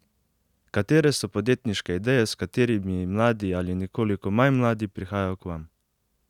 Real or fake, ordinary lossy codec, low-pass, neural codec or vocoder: real; none; 19.8 kHz; none